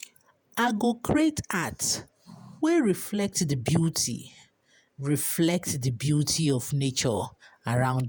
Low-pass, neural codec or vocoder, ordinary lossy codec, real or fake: none; vocoder, 48 kHz, 128 mel bands, Vocos; none; fake